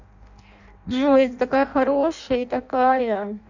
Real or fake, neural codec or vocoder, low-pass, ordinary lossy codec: fake; codec, 16 kHz in and 24 kHz out, 0.6 kbps, FireRedTTS-2 codec; 7.2 kHz; none